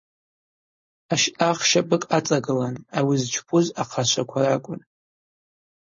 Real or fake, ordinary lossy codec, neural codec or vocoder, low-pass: fake; MP3, 32 kbps; codec, 16 kHz, 4.8 kbps, FACodec; 7.2 kHz